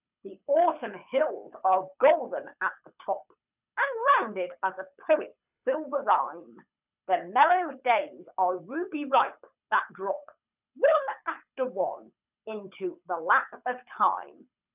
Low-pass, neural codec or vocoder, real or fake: 3.6 kHz; codec, 24 kHz, 6 kbps, HILCodec; fake